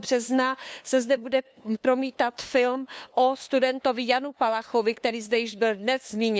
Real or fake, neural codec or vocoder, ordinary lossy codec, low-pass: fake; codec, 16 kHz, 2 kbps, FunCodec, trained on LibriTTS, 25 frames a second; none; none